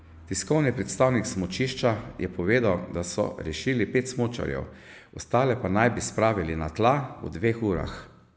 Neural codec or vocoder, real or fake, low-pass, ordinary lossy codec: none; real; none; none